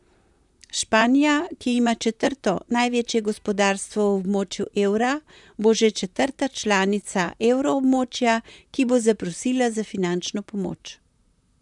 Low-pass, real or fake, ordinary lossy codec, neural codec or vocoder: 10.8 kHz; fake; none; vocoder, 44.1 kHz, 128 mel bands, Pupu-Vocoder